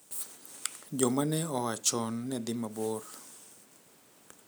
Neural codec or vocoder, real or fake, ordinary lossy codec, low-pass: none; real; none; none